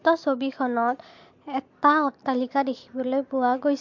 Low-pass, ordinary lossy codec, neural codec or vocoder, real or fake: 7.2 kHz; MP3, 48 kbps; none; real